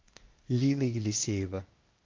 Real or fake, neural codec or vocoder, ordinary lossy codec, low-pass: fake; codec, 16 kHz, 0.8 kbps, ZipCodec; Opus, 32 kbps; 7.2 kHz